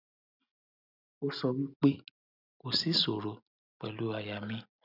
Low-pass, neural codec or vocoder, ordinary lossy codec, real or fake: 5.4 kHz; none; none; real